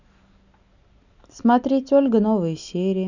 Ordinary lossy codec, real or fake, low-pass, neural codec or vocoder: none; real; 7.2 kHz; none